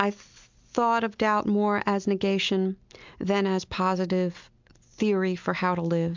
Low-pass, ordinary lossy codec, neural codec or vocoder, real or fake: 7.2 kHz; MP3, 64 kbps; none; real